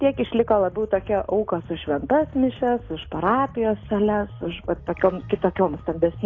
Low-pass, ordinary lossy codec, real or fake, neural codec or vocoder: 7.2 kHz; AAC, 32 kbps; real; none